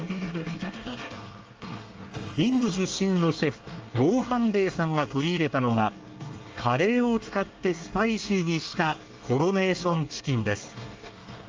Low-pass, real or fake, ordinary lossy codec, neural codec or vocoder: 7.2 kHz; fake; Opus, 24 kbps; codec, 24 kHz, 1 kbps, SNAC